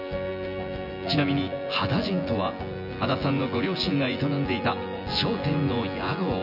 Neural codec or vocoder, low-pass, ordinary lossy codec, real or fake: vocoder, 24 kHz, 100 mel bands, Vocos; 5.4 kHz; none; fake